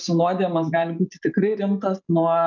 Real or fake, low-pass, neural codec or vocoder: real; 7.2 kHz; none